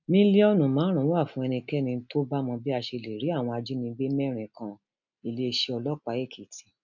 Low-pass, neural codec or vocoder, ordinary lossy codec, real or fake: 7.2 kHz; none; none; real